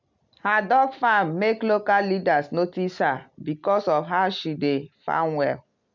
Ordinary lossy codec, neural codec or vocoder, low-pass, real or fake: MP3, 64 kbps; none; 7.2 kHz; real